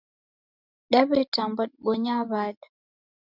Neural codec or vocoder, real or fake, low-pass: none; real; 5.4 kHz